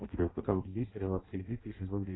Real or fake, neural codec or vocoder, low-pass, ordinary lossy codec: fake; codec, 16 kHz in and 24 kHz out, 0.6 kbps, FireRedTTS-2 codec; 7.2 kHz; AAC, 16 kbps